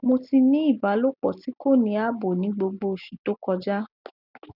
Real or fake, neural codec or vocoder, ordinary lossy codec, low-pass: real; none; none; 5.4 kHz